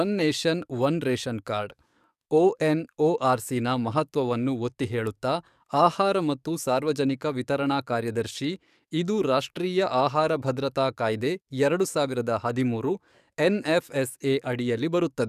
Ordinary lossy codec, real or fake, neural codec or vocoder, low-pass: none; fake; codec, 44.1 kHz, 7.8 kbps, DAC; 14.4 kHz